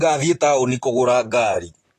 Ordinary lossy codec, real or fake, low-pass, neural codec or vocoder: AAC, 48 kbps; fake; 14.4 kHz; vocoder, 44.1 kHz, 128 mel bands, Pupu-Vocoder